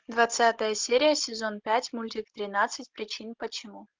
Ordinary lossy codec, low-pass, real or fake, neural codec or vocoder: Opus, 24 kbps; 7.2 kHz; real; none